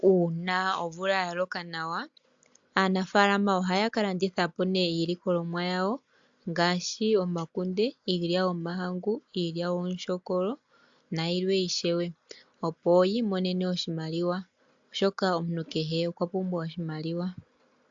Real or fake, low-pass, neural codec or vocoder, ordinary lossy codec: real; 7.2 kHz; none; AAC, 64 kbps